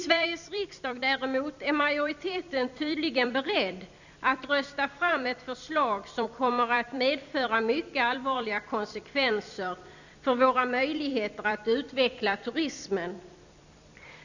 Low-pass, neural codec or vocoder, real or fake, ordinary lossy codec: 7.2 kHz; vocoder, 44.1 kHz, 128 mel bands every 256 samples, BigVGAN v2; fake; none